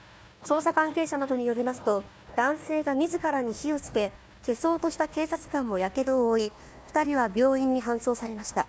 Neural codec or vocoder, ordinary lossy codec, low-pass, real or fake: codec, 16 kHz, 1 kbps, FunCodec, trained on Chinese and English, 50 frames a second; none; none; fake